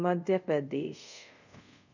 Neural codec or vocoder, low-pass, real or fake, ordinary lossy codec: codec, 24 kHz, 0.5 kbps, DualCodec; 7.2 kHz; fake; none